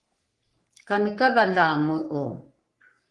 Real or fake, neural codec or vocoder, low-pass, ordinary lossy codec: fake; codec, 44.1 kHz, 3.4 kbps, Pupu-Codec; 10.8 kHz; Opus, 24 kbps